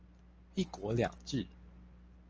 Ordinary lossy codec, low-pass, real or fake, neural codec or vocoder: Opus, 24 kbps; 7.2 kHz; real; none